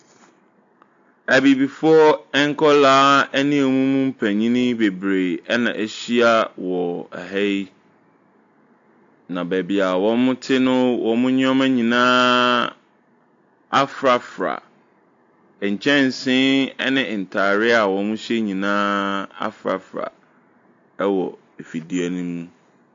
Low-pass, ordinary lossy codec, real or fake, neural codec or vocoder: 7.2 kHz; AAC, 48 kbps; real; none